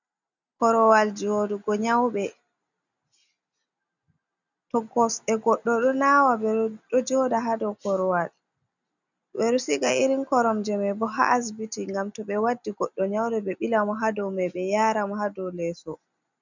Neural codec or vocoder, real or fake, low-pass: none; real; 7.2 kHz